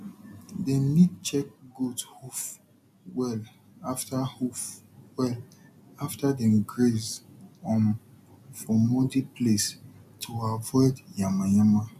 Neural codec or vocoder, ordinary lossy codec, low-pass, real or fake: none; none; 14.4 kHz; real